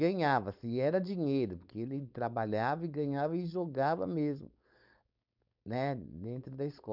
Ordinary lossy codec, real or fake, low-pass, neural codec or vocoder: none; fake; 5.4 kHz; codec, 16 kHz, 4.8 kbps, FACodec